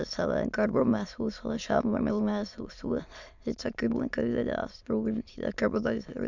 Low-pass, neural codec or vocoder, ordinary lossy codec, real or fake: 7.2 kHz; autoencoder, 22.05 kHz, a latent of 192 numbers a frame, VITS, trained on many speakers; none; fake